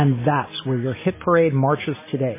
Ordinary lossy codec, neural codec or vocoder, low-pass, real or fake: MP3, 16 kbps; none; 3.6 kHz; real